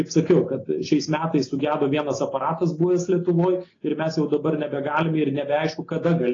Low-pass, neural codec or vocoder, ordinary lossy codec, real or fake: 7.2 kHz; none; AAC, 32 kbps; real